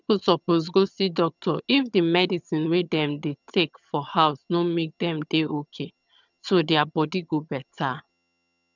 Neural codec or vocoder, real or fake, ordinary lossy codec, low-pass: vocoder, 22.05 kHz, 80 mel bands, HiFi-GAN; fake; none; 7.2 kHz